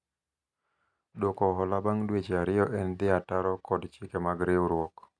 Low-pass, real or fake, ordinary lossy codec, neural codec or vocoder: none; real; none; none